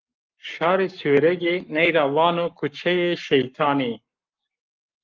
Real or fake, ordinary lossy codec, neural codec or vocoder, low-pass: fake; Opus, 24 kbps; codec, 44.1 kHz, 7.8 kbps, Pupu-Codec; 7.2 kHz